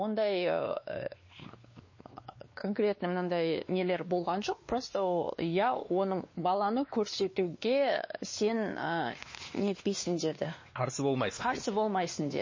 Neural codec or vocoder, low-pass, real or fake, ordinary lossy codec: codec, 16 kHz, 2 kbps, X-Codec, WavLM features, trained on Multilingual LibriSpeech; 7.2 kHz; fake; MP3, 32 kbps